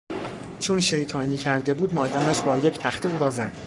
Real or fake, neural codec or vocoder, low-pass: fake; codec, 44.1 kHz, 3.4 kbps, Pupu-Codec; 10.8 kHz